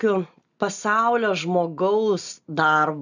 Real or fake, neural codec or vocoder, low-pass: real; none; 7.2 kHz